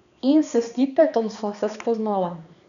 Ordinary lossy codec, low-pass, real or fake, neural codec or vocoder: none; 7.2 kHz; fake; codec, 16 kHz, 2 kbps, X-Codec, HuBERT features, trained on balanced general audio